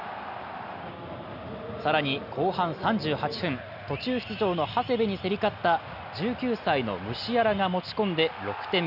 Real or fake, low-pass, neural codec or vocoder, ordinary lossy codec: real; 5.4 kHz; none; none